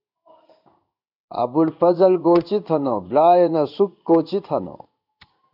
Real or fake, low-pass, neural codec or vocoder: fake; 5.4 kHz; codec, 16 kHz in and 24 kHz out, 1 kbps, XY-Tokenizer